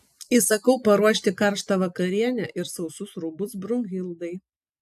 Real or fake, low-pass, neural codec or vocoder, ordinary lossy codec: real; 14.4 kHz; none; AAC, 96 kbps